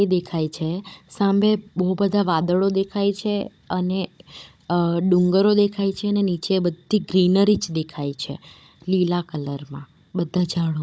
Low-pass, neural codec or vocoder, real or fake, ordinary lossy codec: none; codec, 16 kHz, 16 kbps, FunCodec, trained on Chinese and English, 50 frames a second; fake; none